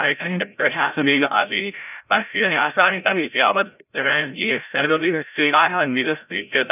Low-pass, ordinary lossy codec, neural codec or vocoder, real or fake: 3.6 kHz; none; codec, 16 kHz, 0.5 kbps, FreqCodec, larger model; fake